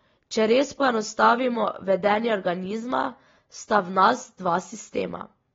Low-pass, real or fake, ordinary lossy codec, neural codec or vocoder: 7.2 kHz; real; AAC, 24 kbps; none